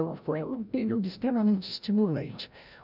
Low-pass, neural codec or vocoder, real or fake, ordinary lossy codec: 5.4 kHz; codec, 16 kHz, 0.5 kbps, FreqCodec, larger model; fake; none